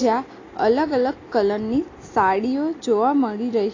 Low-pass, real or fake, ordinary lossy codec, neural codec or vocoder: 7.2 kHz; real; AAC, 32 kbps; none